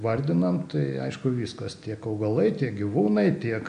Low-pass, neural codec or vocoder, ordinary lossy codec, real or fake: 9.9 kHz; none; AAC, 64 kbps; real